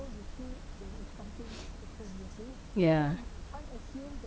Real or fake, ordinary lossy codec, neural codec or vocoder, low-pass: real; none; none; none